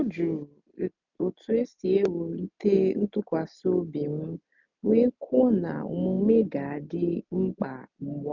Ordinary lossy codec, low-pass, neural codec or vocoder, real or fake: none; 7.2 kHz; none; real